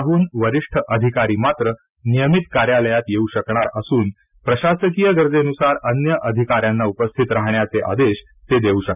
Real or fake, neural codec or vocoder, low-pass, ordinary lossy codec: real; none; 3.6 kHz; none